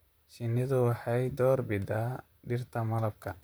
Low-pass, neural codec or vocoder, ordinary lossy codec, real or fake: none; vocoder, 44.1 kHz, 128 mel bands, Pupu-Vocoder; none; fake